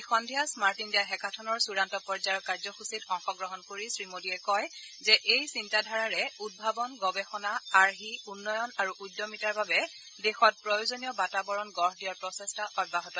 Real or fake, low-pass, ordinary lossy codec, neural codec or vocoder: real; none; none; none